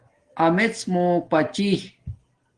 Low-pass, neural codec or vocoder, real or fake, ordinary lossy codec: 9.9 kHz; none; real; Opus, 16 kbps